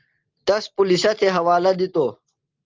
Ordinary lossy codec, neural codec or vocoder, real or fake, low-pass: Opus, 24 kbps; none; real; 7.2 kHz